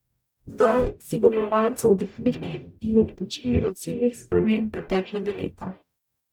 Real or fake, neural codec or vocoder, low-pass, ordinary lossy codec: fake; codec, 44.1 kHz, 0.9 kbps, DAC; 19.8 kHz; none